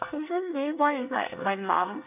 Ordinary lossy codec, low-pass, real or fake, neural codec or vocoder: none; 3.6 kHz; fake; codec, 24 kHz, 1 kbps, SNAC